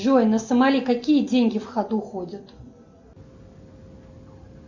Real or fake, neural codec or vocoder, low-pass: real; none; 7.2 kHz